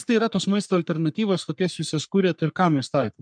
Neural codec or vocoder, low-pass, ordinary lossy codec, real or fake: codec, 44.1 kHz, 3.4 kbps, Pupu-Codec; 9.9 kHz; MP3, 96 kbps; fake